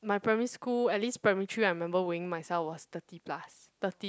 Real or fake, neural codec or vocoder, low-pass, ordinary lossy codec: real; none; none; none